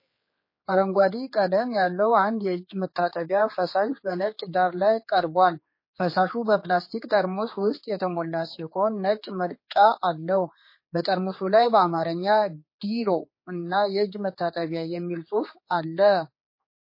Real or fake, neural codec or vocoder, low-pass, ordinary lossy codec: fake; codec, 16 kHz, 4 kbps, X-Codec, HuBERT features, trained on general audio; 5.4 kHz; MP3, 24 kbps